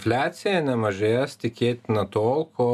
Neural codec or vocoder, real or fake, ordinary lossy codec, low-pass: none; real; MP3, 96 kbps; 14.4 kHz